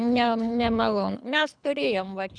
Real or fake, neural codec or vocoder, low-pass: fake; codec, 24 kHz, 3 kbps, HILCodec; 9.9 kHz